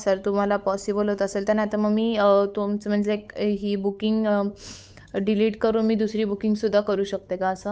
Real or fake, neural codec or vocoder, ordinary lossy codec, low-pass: fake; codec, 16 kHz, 8 kbps, FunCodec, trained on Chinese and English, 25 frames a second; none; none